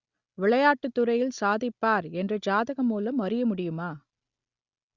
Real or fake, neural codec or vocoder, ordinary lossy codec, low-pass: real; none; Opus, 64 kbps; 7.2 kHz